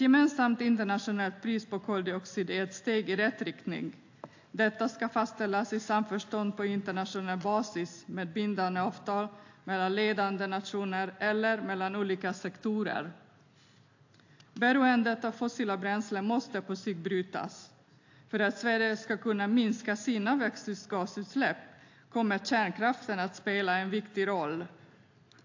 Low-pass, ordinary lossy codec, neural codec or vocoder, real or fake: 7.2 kHz; AAC, 48 kbps; none; real